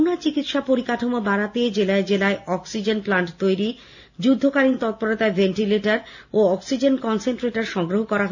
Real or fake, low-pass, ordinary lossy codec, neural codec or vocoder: real; 7.2 kHz; none; none